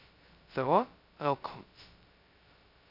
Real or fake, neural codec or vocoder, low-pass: fake; codec, 16 kHz, 0.2 kbps, FocalCodec; 5.4 kHz